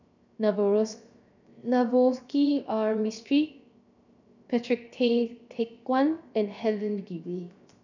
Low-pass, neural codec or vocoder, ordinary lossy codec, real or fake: 7.2 kHz; codec, 16 kHz, 0.7 kbps, FocalCodec; none; fake